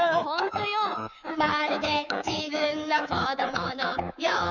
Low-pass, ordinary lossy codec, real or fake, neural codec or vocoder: 7.2 kHz; none; fake; codec, 24 kHz, 3.1 kbps, DualCodec